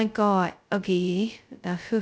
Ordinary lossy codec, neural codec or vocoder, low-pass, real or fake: none; codec, 16 kHz, 0.2 kbps, FocalCodec; none; fake